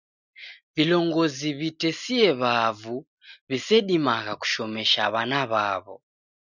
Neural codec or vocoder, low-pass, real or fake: none; 7.2 kHz; real